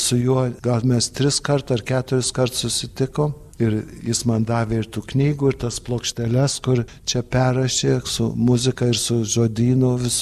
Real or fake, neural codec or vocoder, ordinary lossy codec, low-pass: real; none; MP3, 96 kbps; 14.4 kHz